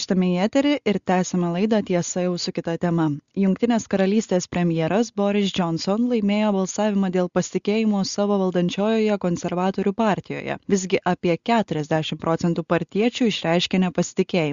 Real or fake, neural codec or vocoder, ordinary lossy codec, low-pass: real; none; Opus, 64 kbps; 7.2 kHz